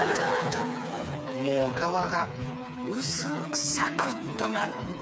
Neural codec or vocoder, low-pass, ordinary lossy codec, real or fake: codec, 16 kHz, 4 kbps, FreqCodec, smaller model; none; none; fake